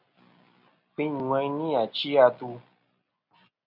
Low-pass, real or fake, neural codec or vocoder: 5.4 kHz; real; none